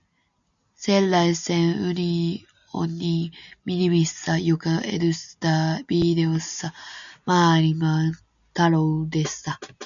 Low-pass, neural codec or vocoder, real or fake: 7.2 kHz; none; real